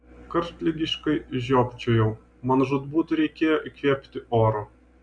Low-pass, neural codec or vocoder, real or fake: 9.9 kHz; none; real